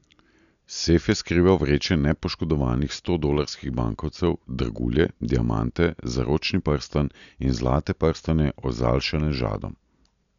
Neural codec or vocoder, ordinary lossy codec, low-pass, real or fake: none; none; 7.2 kHz; real